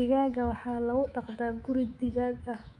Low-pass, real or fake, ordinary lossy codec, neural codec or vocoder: 10.8 kHz; fake; none; codec, 24 kHz, 3.1 kbps, DualCodec